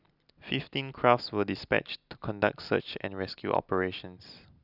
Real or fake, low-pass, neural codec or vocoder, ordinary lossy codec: real; 5.4 kHz; none; none